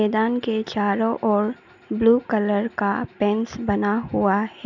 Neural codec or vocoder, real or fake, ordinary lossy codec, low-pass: none; real; none; 7.2 kHz